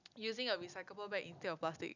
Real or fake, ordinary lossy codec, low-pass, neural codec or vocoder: real; none; 7.2 kHz; none